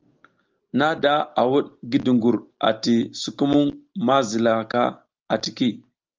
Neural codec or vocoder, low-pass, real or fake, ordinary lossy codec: none; 7.2 kHz; real; Opus, 32 kbps